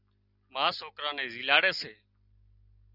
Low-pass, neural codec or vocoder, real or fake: 5.4 kHz; none; real